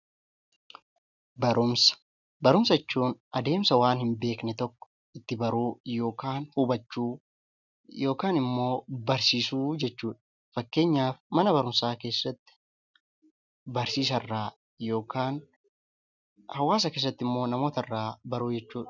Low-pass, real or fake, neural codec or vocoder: 7.2 kHz; real; none